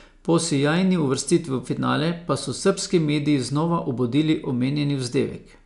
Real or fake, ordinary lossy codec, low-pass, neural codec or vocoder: real; none; 10.8 kHz; none